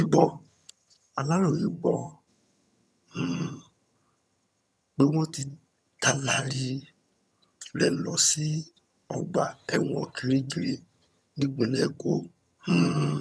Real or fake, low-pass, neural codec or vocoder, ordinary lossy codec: fake; none; vocoder, 22.05 kHz, 80 mel bands, HiFi-GAN; none